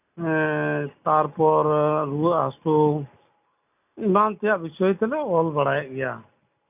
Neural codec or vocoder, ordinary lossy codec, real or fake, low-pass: none; none; real; 3.6 kHz